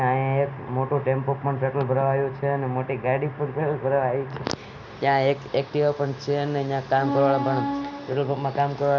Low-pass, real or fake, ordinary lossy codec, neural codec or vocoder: 7.2 kHz; real; none; none